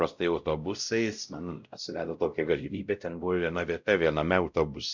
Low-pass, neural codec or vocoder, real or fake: 7.2 kHz; codec, 16 kHz, 0.5 kbps, X-Codec, WavLM features, trained on Multilingual LibriSpeech; fake